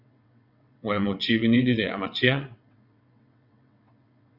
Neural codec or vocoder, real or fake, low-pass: vocoder, 22.05 kHz, 80 mel bands, WaveNeXt; fake; 5.4 kHz